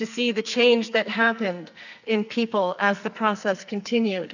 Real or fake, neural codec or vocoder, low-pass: fake; codec, 44.1 kHz, 2.6 kbps, SNAC; 7.2 kHz